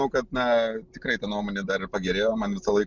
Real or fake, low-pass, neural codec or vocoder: real; 7.2 kHz; none